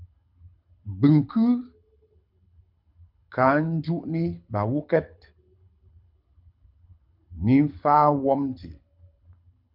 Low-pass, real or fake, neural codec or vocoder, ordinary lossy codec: 5.4 kHz; fake; codec, 24 kHz, 6 kbps, HILCodec; MP3, 48 kbps